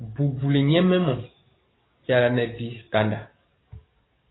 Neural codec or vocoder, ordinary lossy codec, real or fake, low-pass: codec, 44.1 kHz, 7.8 kbps, DAC; AAC, 16 kbps; fake; 7.2 kHz